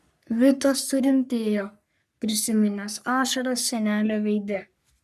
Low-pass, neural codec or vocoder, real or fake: 14.4 kHz; codec, 44.1 kHz, 3.4 kbps, Pupu-Codec; fake